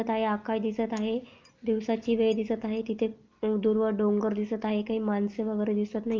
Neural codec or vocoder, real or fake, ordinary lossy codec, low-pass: none; real; Opus, 24 kbps; 7.2 kHz